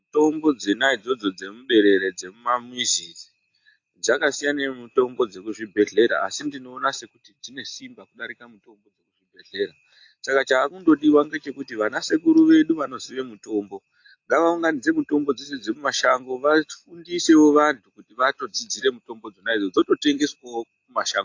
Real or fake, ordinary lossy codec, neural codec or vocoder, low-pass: real; AAC, 48 kbps; none; 7.2 kHz